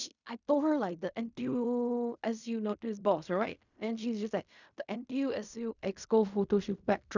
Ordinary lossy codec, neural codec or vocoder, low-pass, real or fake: none; codec, 16 kHz in and 24 kHz out, 0.4 kbps, LongCat-Audio-Codec, fine tuned four codebook decoder; 7.2 kHz; fake